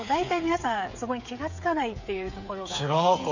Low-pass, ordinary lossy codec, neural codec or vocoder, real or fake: 7.2 kHz; none; codec, 16 kHz, 8 kbps, FreqCodec, smaller model; fake